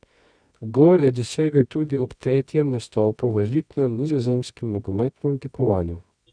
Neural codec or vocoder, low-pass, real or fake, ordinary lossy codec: codec, 24 kHz, 0.9 kbps, WavTokenizer, medium music audio release; 9.9 kHz; fake; none